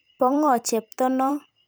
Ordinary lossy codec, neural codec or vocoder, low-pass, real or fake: none; vocoder, 44.1 kHz, 128 mel bands every 512 samples, BigVGAN v2; none; fake